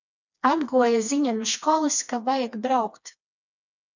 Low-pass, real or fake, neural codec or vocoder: 7.2 kHz; fake; codec, 16 kHz, 2 kbps, FreqCodec, smaller model